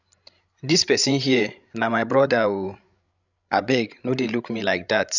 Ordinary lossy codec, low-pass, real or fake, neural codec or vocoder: none; 7.2 kHz; fake; codec, 16 kHz, 16 kbps, FreqCodec, larger model